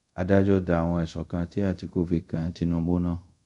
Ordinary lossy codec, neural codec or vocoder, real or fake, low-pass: none; codec, 24 kHz, 0.5 kbps, DualCodec; fake; 10.8 kHz